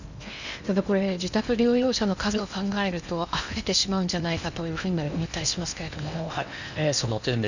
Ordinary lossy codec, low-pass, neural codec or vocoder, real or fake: none; 7.2 kHz; codec, 16 kHz in and 24 kHz out, 0.8 kbps, FocalCodec, streaming, 65536 codes; fake